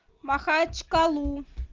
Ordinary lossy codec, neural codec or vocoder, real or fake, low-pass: Opus, 32 kbps; none; real; 7.2 kHz